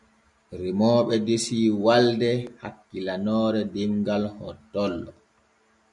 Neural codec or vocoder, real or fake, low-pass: none; real; 10.8 kHz